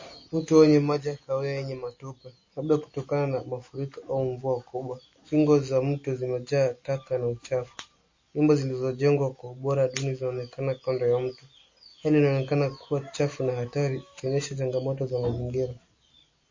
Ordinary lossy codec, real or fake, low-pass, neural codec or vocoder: MP3, 32 kbps; real; 7.2 kHz; none